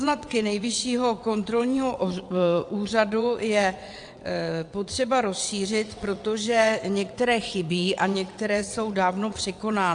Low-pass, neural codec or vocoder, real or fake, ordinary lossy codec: 9.9 kHz; vocoder, 22.05 kHz, 80 mel bands, WaveNeXt; fake; AAC, 64 kbps